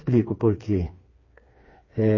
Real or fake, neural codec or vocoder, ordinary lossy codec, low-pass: fake; codec, 16 kHz, 4 kbps, FreqCodec, smaller model; MP3, 32 kbps; 7.2 kHz